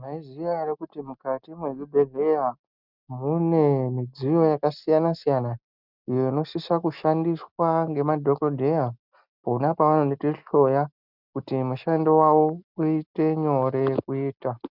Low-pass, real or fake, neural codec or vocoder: 5.4 kHz; real; none